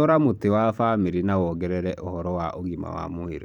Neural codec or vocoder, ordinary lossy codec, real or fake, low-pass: vocoder, 44.1 kHz, 128 mel bands every 512 samples, BigVGAN v2; none; fake; 19.8 kHz